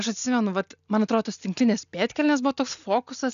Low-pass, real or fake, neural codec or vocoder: 7.2 kHz; real; none